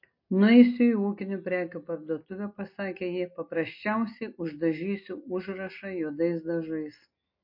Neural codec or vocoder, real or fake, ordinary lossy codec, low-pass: none; real; MP3, 32 kbps; 5.4 kHz